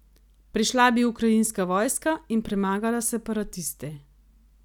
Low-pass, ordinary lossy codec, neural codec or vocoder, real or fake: 19.8 kHz; none; none; real